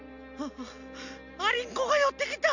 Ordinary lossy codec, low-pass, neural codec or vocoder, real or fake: none; 7.2 kHz; none; real